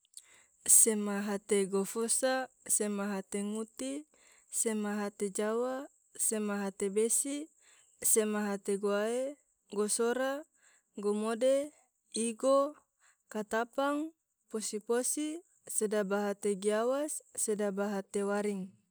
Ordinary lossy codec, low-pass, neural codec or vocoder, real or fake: none; none; none; real